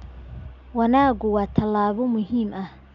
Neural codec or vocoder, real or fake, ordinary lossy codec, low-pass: none; real; none; 7.2 kHz